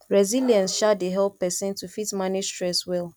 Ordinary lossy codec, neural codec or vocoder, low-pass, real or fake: none; none; 19.8 kHz; real